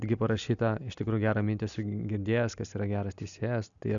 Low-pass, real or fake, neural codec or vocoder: 7.2 kHz; real; none